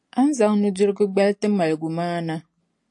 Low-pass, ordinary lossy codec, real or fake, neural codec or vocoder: 10.8 kHz; AAC, 64 kbps; real; none